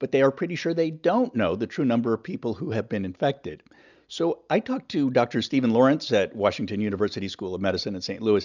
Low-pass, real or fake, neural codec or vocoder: 7.2 kHz; real; none